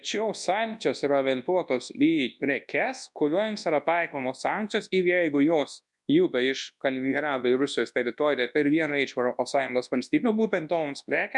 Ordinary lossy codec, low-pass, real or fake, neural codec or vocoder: MP3, 96 kbps; 10.8 kHz; fake; codec, 24 kHz, 0.9 kbps, WavTokenizer, large speech release